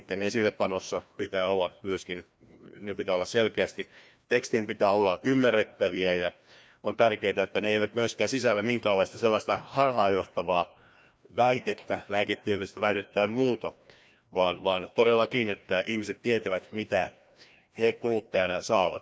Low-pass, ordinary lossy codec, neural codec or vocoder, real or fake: none; none; codec, 16 kHz, 1 kbps, FreqCodec, larger model; fake